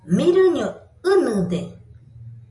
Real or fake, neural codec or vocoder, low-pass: real; none; 10.8 kHz